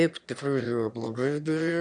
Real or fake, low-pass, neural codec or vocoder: fake; 9.9 kHz; autoencoder, 22.05 kHz, a latent of 192 numbers a frame, VITS, trained on one speaker